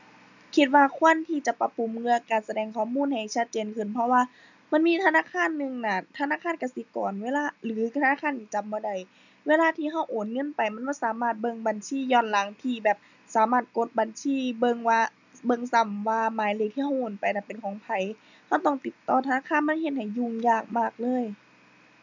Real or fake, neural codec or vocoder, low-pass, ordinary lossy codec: real; none; 7.2 kHz; none